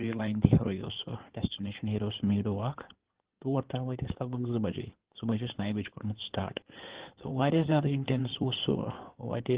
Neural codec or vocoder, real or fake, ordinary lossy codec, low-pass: codec, 16 kHz in and 24 kHz out, 2.2 kbps, FireRedTTS-2 codec; fake; Opus, 16 kbps; 3.6 kHz